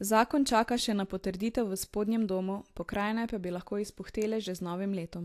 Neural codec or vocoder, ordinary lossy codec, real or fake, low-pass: none; AAC, 64 kbps; real; 14.4 kHz